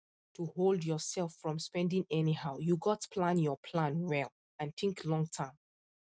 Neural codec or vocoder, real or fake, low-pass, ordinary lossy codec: none; real; none; none